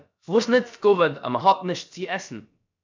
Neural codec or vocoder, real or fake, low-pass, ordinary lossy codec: codec, 16 kHz, about 1 kbps, DyCAST, with the encoder's durations; fake; 7.2 kHz; MP3, 64 kbps